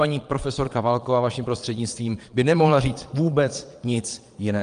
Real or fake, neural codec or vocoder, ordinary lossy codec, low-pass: fake; vocoder, 22.05 kHz, 80 mel bands, Vocos; Opus, 32 kbps; 9.9 kHz